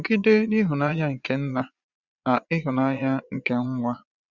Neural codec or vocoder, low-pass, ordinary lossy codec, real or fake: vocoder, 22.05 kHz, 80 mel bands, Vocos; 7.2 kHz; Opus, 64 kbps; fake